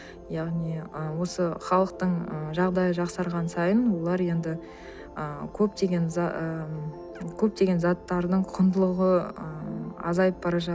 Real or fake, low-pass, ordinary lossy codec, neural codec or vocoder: real; none; none; none